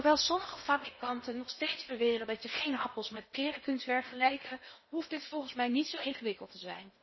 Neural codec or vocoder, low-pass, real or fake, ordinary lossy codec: codec, 16 kHz in and 24 kHz out, 0.8 kbps, FocalCodec, streaming, 65536 codes; 7.2 kHz; fake; MP3, 24 kbps